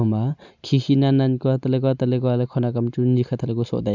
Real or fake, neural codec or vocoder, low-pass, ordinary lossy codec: real; none; 7.2 kHz; none